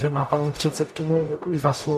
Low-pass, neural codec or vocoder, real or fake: 14.4 kHz; codec, 44.1 kHz, 0.9 kbps, DAC; fake